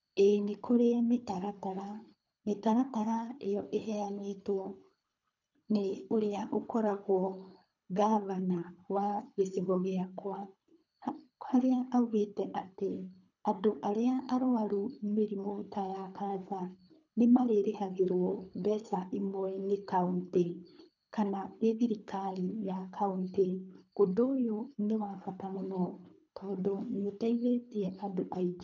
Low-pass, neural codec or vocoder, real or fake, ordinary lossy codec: 7.2 kHz; codec, 24 kHz, 3 kbps, HILCodec; fake; none